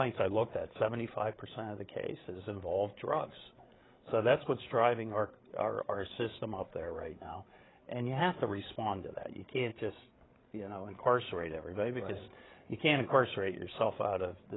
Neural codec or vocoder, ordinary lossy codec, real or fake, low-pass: codec, 16 kHz, 8 kbps, FreqCodec, larger model; AAC, 16 kbps; fake; 7.2 kHz